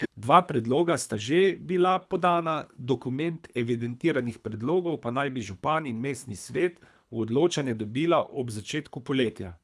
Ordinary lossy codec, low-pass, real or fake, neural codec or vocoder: none; none; fake; codec, 24 kHz, 3 kbps, HILCodec